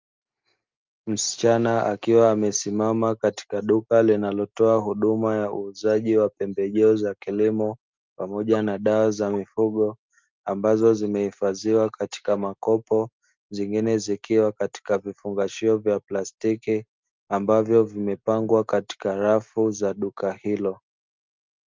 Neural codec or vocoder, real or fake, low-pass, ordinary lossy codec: none; real; 7.2 kHz; Opus, 24 kbps